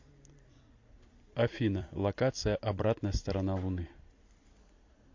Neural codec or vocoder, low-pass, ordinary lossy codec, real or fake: vocoder, 44.1 kHz, 128 mel bands every 256 samples, BigVGAN v2; 7.2 kHz; MP3, 48 kbps; fake